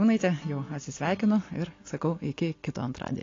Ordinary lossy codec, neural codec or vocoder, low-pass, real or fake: AAC, 32 kbps; none; 7.2 kHz; real